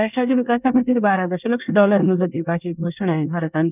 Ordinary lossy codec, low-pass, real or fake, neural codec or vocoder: none; 3.6 kHz; fake; codec, 24 kHz, 1 kbps, SNAC